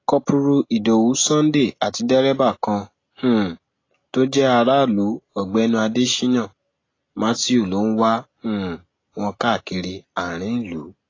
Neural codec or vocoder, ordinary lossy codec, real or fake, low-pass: none; AAC, 32 kbps; real; 7.2 kHz